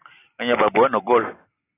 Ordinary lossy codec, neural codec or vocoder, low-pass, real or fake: AAC, 16 kbps; none; 3.6 kHz; real